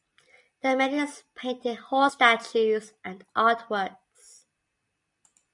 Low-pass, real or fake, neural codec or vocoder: 10.8 kHz; real; none